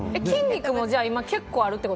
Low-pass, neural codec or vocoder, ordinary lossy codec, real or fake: none; none; none; real